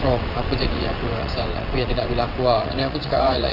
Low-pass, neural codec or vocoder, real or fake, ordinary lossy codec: 5.4 kHz; vocoder, 22.05 kHz, 80 mel bands, WaveNeXt; fake; AAC, 32 kbps